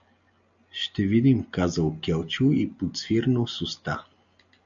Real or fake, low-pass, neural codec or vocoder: real; 7.2 kHz; none